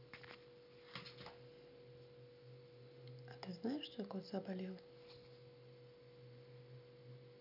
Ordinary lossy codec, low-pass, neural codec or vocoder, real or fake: none; 5.4 kHz; none; real